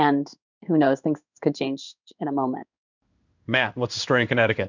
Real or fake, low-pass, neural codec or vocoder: fake; 7.2 kHz; codec, 16 kHz in and 24 kHz out, 1 kbps, XY-Tokenizer